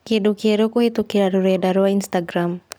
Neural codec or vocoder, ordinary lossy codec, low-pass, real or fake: none; none; none; real